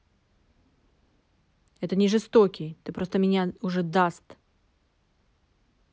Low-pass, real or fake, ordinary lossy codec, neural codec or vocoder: none; real; none; none